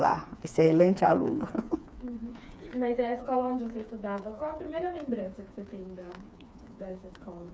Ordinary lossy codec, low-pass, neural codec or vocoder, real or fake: none; none; codec, 16 kHz, 4 kbps, FreqCodec, smaller model; fake